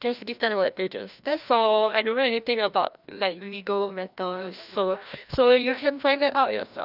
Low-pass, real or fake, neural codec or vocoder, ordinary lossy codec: 5.4 kHz; fake; codec, 16 kHz, 1 kbps, FreqCodec, larger model; none